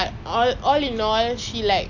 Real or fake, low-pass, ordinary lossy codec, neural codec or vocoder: real; 7.2 kHz; none; none